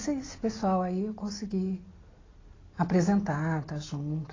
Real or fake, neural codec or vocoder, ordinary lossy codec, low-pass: real; none; AAC, 32 kbps; 7.2 kHz